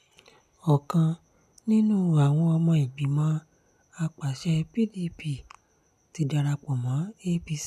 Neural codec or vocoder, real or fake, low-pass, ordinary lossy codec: none; real; 14.4 kHz; none